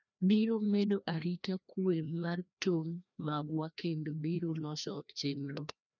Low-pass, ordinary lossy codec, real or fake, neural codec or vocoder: 7.2 kHz; none; fake; codec, 16 kHz, 1 kbps, FreqCodec, larger model